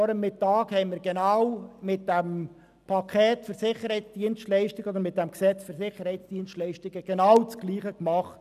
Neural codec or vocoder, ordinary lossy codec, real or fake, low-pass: none; none; real; 14.4 kHz